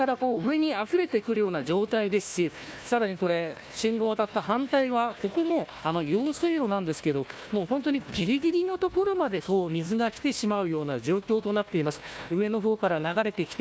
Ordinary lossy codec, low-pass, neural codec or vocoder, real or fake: none; none; codec, 16 kHz, 1 kbps, FunCodec, trained on Chinese and English, 50 frames a second; fake